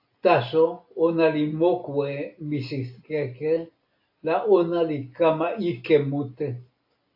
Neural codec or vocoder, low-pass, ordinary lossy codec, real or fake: none; 5.4 kHz; AAC, 48 kbps; real